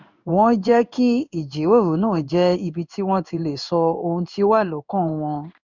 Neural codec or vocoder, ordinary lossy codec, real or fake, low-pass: codec, 16 kHz in and 24 kHz out, 1 kbps, XY-Tokenizer; none; fake; 7.2 kHz